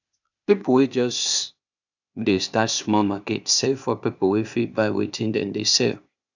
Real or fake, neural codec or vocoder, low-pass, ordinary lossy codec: fake; codec, 16 kHz, 0.8 kbps, ZipCodec; 7.2 kHz; none